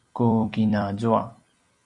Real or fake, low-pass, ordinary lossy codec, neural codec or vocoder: fake; 10.8 kHz; MP3, 96 kbps; vocoder, 44.1 kHz, 128 mel bands every 256 samples, BigVGAN v2